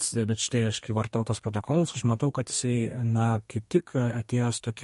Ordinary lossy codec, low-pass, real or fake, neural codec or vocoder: MP3, 48 kbps; 14.4 kHz; fake; codec, 32 kHz, 1.9 kbps, SNAC